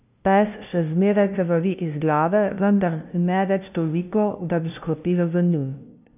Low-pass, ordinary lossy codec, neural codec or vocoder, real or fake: 3.6 kHz; none; codec, 16 kHz, 0.5 kbps, FunCodec, trained on LibriTTS, 25 frames a second; fake